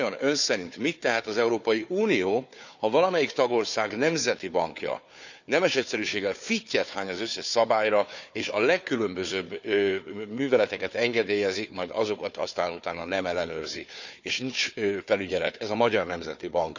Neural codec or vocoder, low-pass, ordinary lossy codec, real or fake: codec, 16 kHz, 4 kbps, FunCodec, trained on Chinese and English, 50 frames a second; 7.2 kHz; none; fake